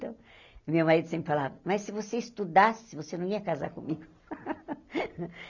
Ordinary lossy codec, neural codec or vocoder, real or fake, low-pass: none; none; real; 7.2 kHz